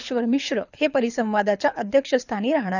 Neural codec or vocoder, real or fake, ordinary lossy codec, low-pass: codec, 24 kHz, 6 kbps, HILCodec; fake; none; 7.2 kHz